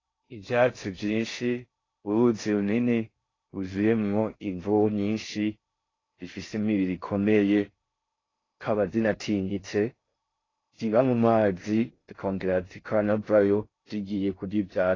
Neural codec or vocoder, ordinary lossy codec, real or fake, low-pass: codec, 16 kHz in and 24 kHz out, 0.6 kbps, FocalCodec, streaming, 2048 codes; AAC, 32 kbps; fake; 7.2 kHz